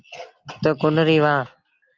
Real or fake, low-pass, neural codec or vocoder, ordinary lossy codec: real; 7.2 kHz; none; Opus, 24 kbps